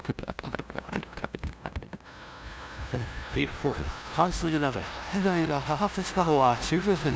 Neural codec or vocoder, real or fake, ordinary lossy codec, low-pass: codec, 16 kHz, 0.5 kbps, FunCodec, trained on LibriTTS, 25 frames a second; fake; none; none